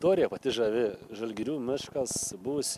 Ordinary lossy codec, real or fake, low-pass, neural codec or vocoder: MP3, 96 kbps; real; 14.4 kHz; none